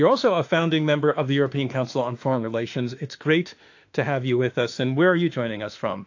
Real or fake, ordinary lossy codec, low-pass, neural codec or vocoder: fake; AAC, 48 kbps; 7.2 kHz; autoencoder, 48 kHz, 32 numbers a frame, DAC-VAE, trained on Japanese speech